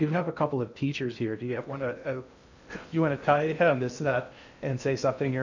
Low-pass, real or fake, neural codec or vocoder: 7.2 kHz; fake; codec, 16 kHz in and 24 kHz out, 0.6 kbps, FocalCodec, streaming, 4096 codes